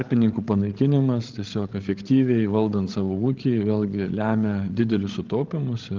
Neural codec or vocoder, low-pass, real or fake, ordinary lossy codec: codec, 16 kHz, 16 kbps, FreqCodec, larger model; 7.2 kHz; fake; Opus, 16 kbps